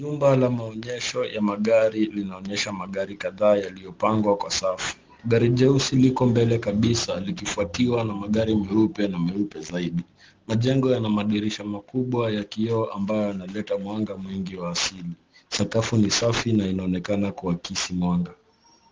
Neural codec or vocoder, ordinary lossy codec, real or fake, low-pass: none; Opus, 16 kbps; real; 7.2 kHz